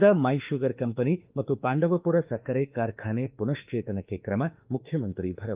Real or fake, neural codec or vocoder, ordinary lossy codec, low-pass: fake; autoencoder, 48 kHz, 32 numbers a frame, DAC-VAE, trained on Japanese speech; Opus, 24 kbps; 3.6 kHz